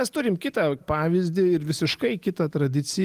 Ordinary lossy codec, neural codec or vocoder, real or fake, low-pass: Opus, 24 kbps; none; real; 14.4 kHz